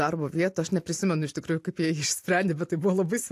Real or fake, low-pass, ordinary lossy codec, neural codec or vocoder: real; 14.4 kHz; AAC, 64 kbps; none